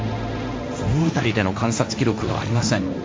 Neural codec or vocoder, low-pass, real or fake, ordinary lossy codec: codec, 16 kHz, 1.1 kbps, Voila-Tokenizer; none; fake; none